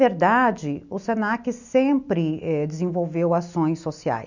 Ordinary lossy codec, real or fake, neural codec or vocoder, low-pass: none; real; none; 7.2 kHz